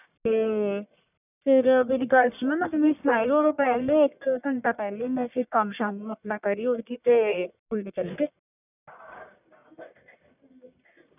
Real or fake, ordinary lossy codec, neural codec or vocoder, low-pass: fake; none; codec, 44.1 kHz, 1.7 kbps, Pupu-Codec; 3.6 kHz